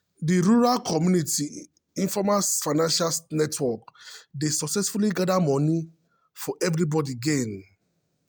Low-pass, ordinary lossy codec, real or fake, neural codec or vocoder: none; none; real; none